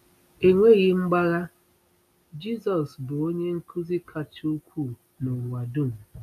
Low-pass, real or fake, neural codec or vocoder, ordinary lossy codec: 14.4 kHz; real; none; none